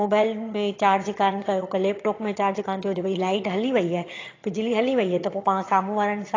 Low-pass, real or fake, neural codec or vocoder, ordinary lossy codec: 7.2 kHz; fake; vocoder, 22.05 kHz, 80 mel bands, HiFi-GAN; AAC, 32 kbps